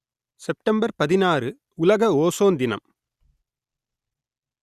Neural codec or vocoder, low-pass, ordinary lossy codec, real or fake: none; 14.4 kHz; Opus, 64 kbps; real